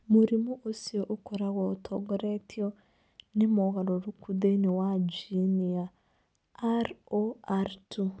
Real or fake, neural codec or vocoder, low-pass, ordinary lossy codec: real; none; none; none